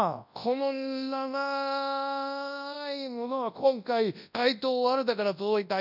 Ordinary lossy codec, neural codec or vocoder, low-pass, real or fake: none; codec, 24 kHz, 0.9 kbps, WavTokenizer, large speech release; 5.4 kHz; fake